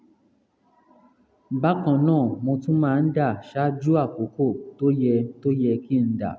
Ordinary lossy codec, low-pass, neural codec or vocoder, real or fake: none; none; none; real